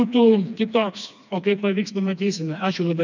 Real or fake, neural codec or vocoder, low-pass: fake; codec, 16 kHz, 2 kbps, FreqCodec, smaller model; 7.2 kHz